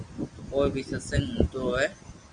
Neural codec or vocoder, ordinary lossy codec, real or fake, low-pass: none; Opus, 64 kbps; real; 9.9 kHz